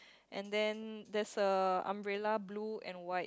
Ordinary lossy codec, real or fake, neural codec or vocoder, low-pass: none; real; none; none